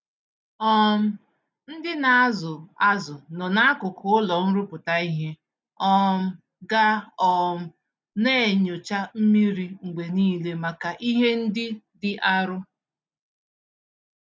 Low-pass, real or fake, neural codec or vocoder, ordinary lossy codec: none; real; none; none